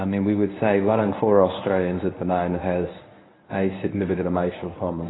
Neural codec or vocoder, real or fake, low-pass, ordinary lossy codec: codec, 24 kHz, 0.9 kbps, WavTokenizer, medium speech release version 1; fake; 7.2 kHz; AAC, 16 kbps